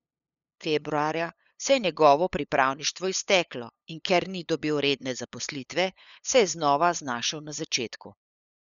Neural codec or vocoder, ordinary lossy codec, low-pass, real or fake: codec, 16 kHz, 8 kbps, FunCodec, trained on LibriTTS, 25 frames a second; none; 7.2 kHz; fake